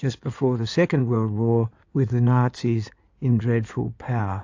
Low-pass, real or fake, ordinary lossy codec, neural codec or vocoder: 7.2 kHz; fake; AAC, 48 kbps; codec, 16 kHz in and 24 kHz out, 2.2 kbps, FireRedTTS-2 codec